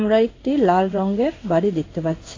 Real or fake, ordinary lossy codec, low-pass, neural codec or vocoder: fake; AAC, 32 kbps; 7.2 kHz; codec, 16 kHz in and 24 kHz out, 1 kbps, XY-Tokenizer